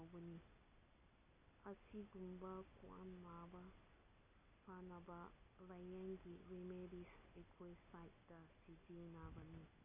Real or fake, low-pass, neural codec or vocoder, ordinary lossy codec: real; 3.6 kHz; none; none